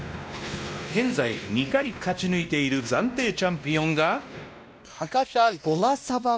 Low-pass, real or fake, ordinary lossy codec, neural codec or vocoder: none; fake; none; codec, 16 kHz, 1 kbps, X-Codec, WavLM features, trained on Multilingual LibriSpeech